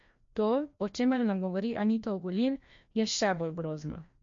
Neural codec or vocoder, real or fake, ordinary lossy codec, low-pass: codec, 16 kHz, 1 kbps, FreqCodec, larger model; fake; MP3, 48 kbps; 7.2 kHz